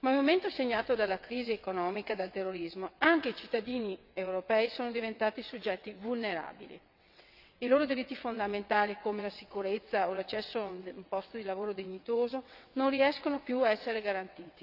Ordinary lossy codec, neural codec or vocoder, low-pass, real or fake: none; vocoder, 22.05 kHz, 80 mel bands, WaveNeXt; 5.4 kHz; fake